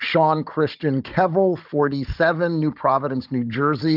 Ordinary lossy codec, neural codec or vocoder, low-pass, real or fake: Opus, 24 kbps; codec, 16 kHz, 16 kbps, FunCodec, trained on Chinese and English, 50 frames a second; 5.4 kHz; fake